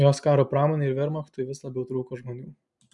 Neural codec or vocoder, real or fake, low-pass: none; real; 10.8 kHz